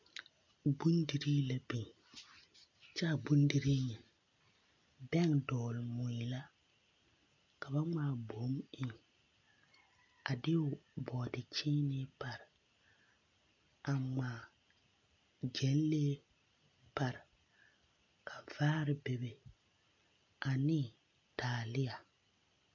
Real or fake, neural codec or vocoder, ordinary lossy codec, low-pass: real; none; MP3, 48 kbps; 7.2 kHz